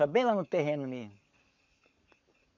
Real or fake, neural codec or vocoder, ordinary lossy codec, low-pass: fake; codec, 44.1 kHz, 7.8 kbps, Pupu-Codec; none; 7.2 kHz